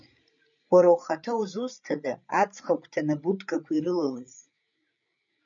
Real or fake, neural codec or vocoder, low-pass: fake; codec, 16 kHz, 8 kbps, FreqCodec, larger model; 7.2 kHz